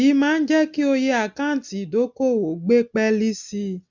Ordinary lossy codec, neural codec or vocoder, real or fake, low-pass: MP3, 64 kbps; none; real; 7.2 kHz